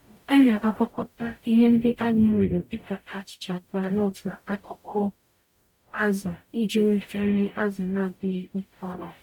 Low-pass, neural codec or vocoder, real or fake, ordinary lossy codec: 19.8 kHz; codec, 44.1 kHz, 0.9 kbps, DAC; fake; none